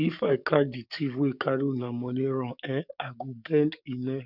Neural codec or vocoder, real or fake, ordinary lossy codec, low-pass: codec, 44.1 kHz, 7.8 kbps, DAC; fake; none; 5.4 kHz